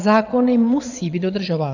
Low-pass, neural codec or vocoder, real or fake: 7.2 kHz; vocoder, 22.05 kHz, 80 mel bands, Vocos; fake